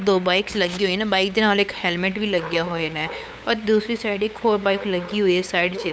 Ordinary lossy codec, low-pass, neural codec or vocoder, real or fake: none; none; codec, 16 kHz, 8 kbps, FunCodec, trained on LibriTTS, 25 frames a second; fake